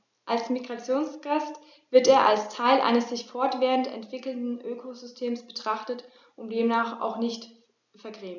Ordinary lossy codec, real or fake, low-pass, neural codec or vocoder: none; real; 7.2 kHz; none